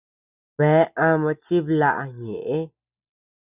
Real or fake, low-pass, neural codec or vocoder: real; 3.6 kHz; none